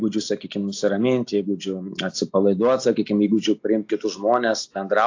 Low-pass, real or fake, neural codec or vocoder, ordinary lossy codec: 7.2 kHz; real; none; AAC, 48 kbps